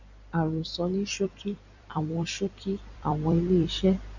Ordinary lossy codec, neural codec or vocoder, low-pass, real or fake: none; vocoder, 44.1 kHz, 128 mel bands every 256 samples, BigVGAN v2; 7.2 kHz; fake